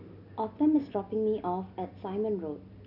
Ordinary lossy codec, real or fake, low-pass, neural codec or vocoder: none; real; 5.4 kHz; none